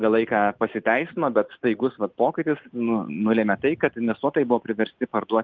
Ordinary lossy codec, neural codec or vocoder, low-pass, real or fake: Opus, 32 kbps; none; 7.2 kHz; real